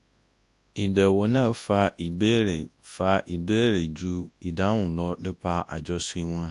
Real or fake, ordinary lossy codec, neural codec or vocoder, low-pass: fake; AAC, 64 kbps; codec, 24 kHz, 0.9 kbps, WavTokenizer, large speech release; 10.8 kHz